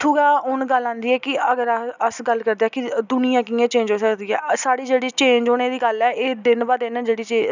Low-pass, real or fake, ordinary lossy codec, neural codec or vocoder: 7.2 kHz; real; none; none